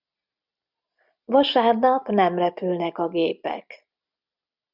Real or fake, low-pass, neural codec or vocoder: real; 5.4 kHz; none